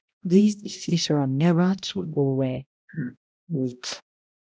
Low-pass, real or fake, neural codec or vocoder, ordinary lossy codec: none; fake; codec, 16 kHz, 0.5 kbps, X-Codec, HuBERT features, trained on balanced general audio; none